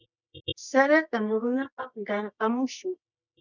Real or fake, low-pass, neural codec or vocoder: fake; 7.2 kHz; codec, 24 kHz, 0.9 kbps, WavTokenizer, medium music audio release